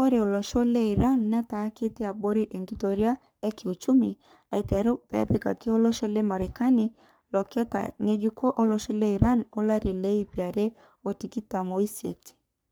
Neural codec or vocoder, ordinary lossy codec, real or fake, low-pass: codec, 44.1 kHz, 3.4 kbps, Pupu-Codec; none; fake; none